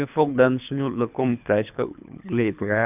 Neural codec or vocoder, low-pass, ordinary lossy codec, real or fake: codec, 24 kHz, 3 kbps, HILCodec; 3.6 kHz; none; fake